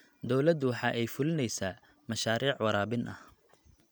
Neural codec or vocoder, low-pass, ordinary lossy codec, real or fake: none; none; none; real